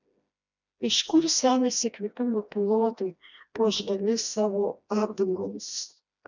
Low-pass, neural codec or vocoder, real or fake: 7.2 kHz; codec, 16 kHz, 1 kbps, FreqCodec, smaller model; fake